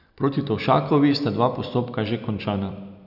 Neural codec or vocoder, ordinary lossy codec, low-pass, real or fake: none; none; 5.4 kHz; real